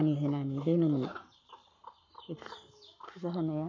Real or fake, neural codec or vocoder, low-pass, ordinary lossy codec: fake; codec, 44.1 kHz, 7.8 kbps, Pupu-Codec; 7.2 kHz; MP3, 64 kbps